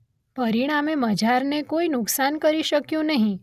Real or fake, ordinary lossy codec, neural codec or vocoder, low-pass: real; none; none; 14.4 kHz